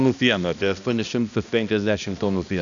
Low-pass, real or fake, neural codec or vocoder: 7.2 kHz; fake; codec, 16 kHz, 1 kbps, X-Codec, HuBERT features, trained on balanced general audio